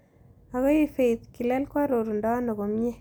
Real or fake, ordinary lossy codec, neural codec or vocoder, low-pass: real; none; none; none